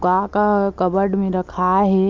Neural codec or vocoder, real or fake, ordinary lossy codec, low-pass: none; real; Opus, 24 kbps; 7.2 kHz